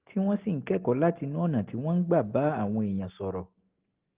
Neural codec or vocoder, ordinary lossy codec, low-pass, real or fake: none; Opus, 16 kbps; 3.6 kHz; real